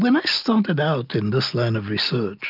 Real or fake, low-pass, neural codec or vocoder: real; 5.4 kHz; none